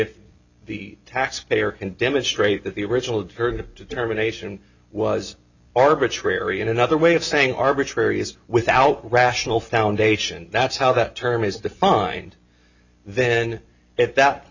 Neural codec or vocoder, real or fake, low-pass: none; real; 7.2 kHz